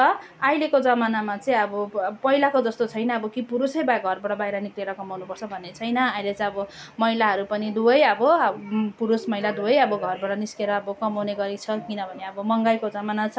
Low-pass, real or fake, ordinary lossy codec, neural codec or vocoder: none; real; none; none